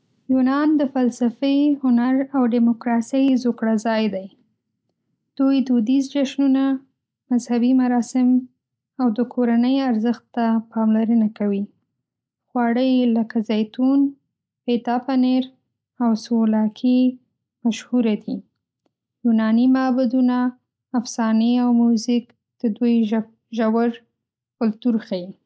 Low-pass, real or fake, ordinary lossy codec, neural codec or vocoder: none; real; none; none